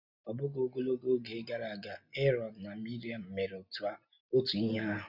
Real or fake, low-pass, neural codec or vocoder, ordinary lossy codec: real; 5.4 kHz; none; none